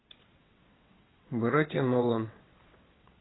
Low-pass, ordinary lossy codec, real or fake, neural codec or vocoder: 7.2 kHz; AAC, 16 kbps; fake; vocoder, 22.05 kHz, 80 mel bands, WaveNeXt